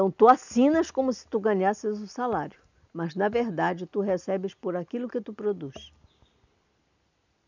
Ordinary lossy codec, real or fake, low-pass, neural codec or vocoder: none; real; 7.2 kHz; none